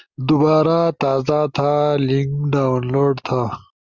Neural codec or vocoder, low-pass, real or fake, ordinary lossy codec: none; 7.2 kHz; real; Opus, 32 kbps